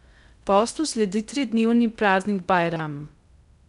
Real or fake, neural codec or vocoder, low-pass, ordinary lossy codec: fake; codec, 16 kHz in and 24 kHz out, 0.6 kbps, FocalCodec, streaming, 2048 codes; 10.8 kHz; none